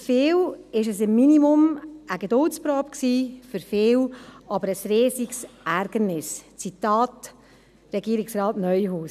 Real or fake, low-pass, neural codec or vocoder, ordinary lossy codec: real; 14.4 kHz; none; none